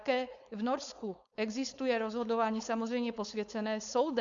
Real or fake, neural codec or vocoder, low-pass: fake; codec, 16 kHz, 4.8 kbps, FACodec; 7.2 kHz